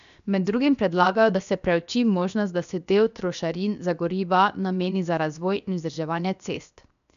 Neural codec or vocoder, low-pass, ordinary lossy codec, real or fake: codec, 16 kHz, 0.7 kbps, FocalCodec; 7.2 kHz; MP3, 96 kbps; fake